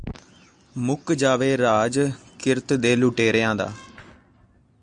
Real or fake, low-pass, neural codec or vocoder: real; 9.9 kHz; none